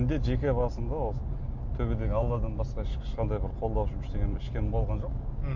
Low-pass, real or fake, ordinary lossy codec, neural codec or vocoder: 7.2 kHz; real; MP3, 48 kbps; none